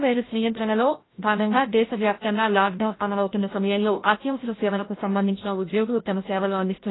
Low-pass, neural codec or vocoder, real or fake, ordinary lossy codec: 7.2 kHz; codec, 16 kHz, 0.5 kbps, FreqCodec, larger model; fake; AAC, 16 kbps